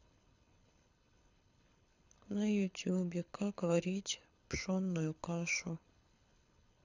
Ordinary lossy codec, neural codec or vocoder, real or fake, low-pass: none; codec, 24 kHz, 6 kbps, HILCodec; fake; 7.2 kHz